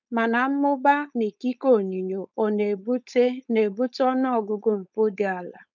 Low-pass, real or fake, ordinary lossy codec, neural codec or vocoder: 7.2 kHz; fake; none; codec, 16 kHz, 4.8 kbps, FACodec